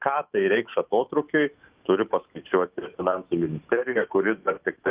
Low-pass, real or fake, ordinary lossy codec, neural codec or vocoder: 3.6 kHz; fake; Opus, 24 kbps; autoencoder, 48 kHz, 128 numbers a frame, DAC-VAE, trained on Japanese speech